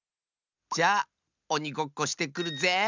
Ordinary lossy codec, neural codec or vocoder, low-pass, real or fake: none; none; 7.2 kHz; real